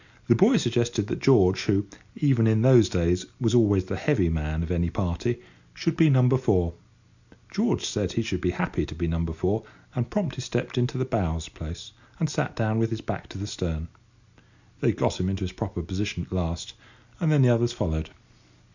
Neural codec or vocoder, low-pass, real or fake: none; 7.2 kHz; real